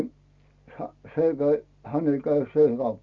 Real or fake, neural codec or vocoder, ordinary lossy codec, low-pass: real; none; Opus, 64 kbps; 7.2 kHz